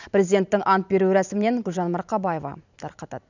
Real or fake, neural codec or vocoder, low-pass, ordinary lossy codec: fake; vocoder, 44.1 kHz, 128 mel bands every 512 samples, BigVGAN v2; 7.2 kHz; none